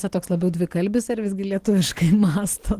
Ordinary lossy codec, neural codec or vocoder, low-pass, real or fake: Opus, 16 kbps; none; 14.4 kHz; real